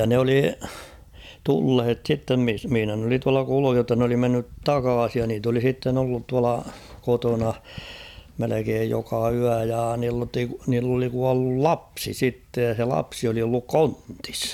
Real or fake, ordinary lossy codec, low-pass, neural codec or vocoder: real; none; 19.8 kHz; none